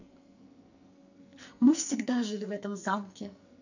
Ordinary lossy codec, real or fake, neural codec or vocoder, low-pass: none; fake; codec, 44.1 kHz, 2.6 kbps, SNAC; 7.2 kHz